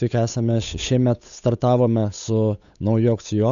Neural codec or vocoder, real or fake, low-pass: codec, 16 kHz, 8 kbps, FunCodec, trained on Chinese and English, 25 frames a second; fake; 7.2 kHz